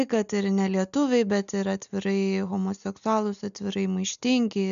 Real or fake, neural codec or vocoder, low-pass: real; none; 7.2 kHz